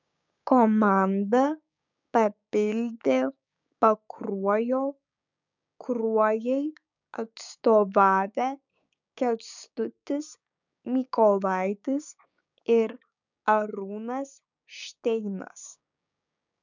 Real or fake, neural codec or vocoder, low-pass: fake; codec, 16 kHz, 6 kbps, DAC; 7.2 kHz